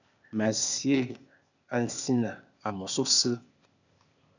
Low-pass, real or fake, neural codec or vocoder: 7.2 kHz; fake; codec, 16 kHz, 0.8 kbps, ZipCodec